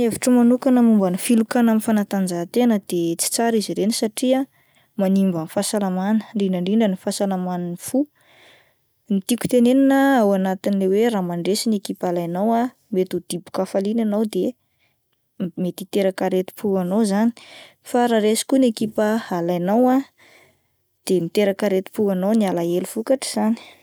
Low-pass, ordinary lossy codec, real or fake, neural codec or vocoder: none; none; real; none